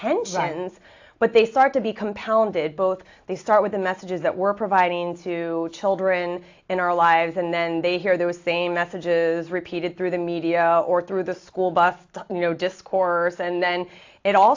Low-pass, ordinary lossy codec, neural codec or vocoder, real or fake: 7.2 kHz; AAC, 48 kbps; none; real